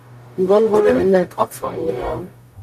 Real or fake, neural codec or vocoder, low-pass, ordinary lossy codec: fake; codec, 44.1 kHz, 0.9 kbps, DAC; 14.4 kHz; MP3, 96 kbps